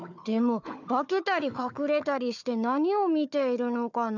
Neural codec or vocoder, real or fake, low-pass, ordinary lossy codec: codec, 16 kHz, 4 kbps, FunCodec, trained on Chinese and English, 50 frames a second; fake; 7.2 kHz; none